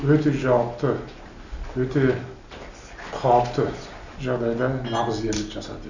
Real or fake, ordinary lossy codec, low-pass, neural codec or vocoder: real; none; 7.2 kHz; none